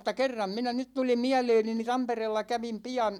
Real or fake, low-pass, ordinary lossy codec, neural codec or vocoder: real; 19.8 kHz; none; none